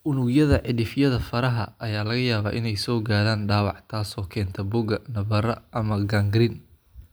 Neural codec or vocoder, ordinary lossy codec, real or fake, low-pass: none; none; real; none